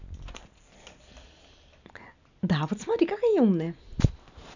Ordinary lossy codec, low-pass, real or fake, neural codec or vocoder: none; 7.2 kHz; real; none